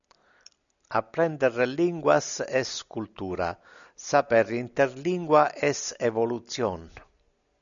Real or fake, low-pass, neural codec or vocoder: real; 7.2 kHz; none